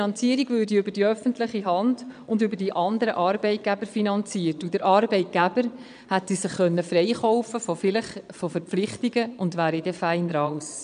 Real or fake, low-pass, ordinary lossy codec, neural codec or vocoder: fake; 9.9 kHz; none; vocoder, 22.05 kHz, 80 mel bands, Vocos